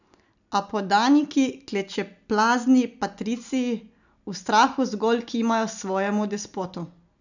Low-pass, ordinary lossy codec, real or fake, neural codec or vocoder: 7.2 kHz; none; real; none